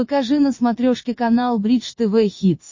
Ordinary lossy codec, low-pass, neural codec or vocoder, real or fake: MP3, 32 kbps; 7.2 kHz; vocoder, 44.1 kHz, 80 mel bands, Vocos; fake